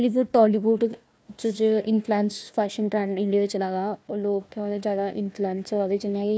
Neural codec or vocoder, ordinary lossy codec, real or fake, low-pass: codec, 16 kHz, 1 kbps, FunCodec, trained on Chinese and English, 50 frames a second; none; fake; none